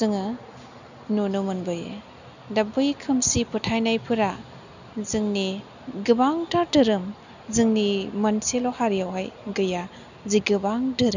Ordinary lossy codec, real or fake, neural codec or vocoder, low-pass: none; real; none; 7.2 kHz